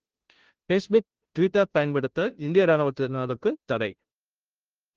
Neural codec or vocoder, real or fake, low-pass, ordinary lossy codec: codec, 16 kHz, 0.5 kbps, FunCodec, trained on Chinese and English, 25 frames a second; fake; 7.2 kHz; Opus, 16 kbps